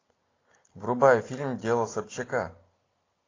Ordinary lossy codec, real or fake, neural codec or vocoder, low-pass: AAC, 32 kbps; real; none; 7.2 kHz